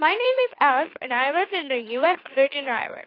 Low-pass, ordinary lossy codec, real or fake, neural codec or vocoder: 5.4 kHz; AAC, 32 kbps; fake; autoencoder, 44.1 kHz, a latent of 192 numbers a frame, MeloTTS